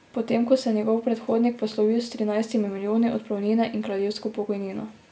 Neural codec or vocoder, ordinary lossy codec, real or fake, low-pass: none; none; real; none